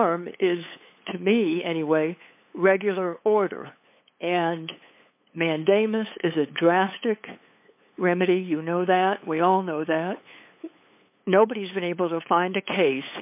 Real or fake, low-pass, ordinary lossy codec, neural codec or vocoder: fake; 3.6 kHz; MP3, 32 kbps; codec, 16 kHz, 8 kbps, FunCodec, trained on LibriTTS, 25 frames a second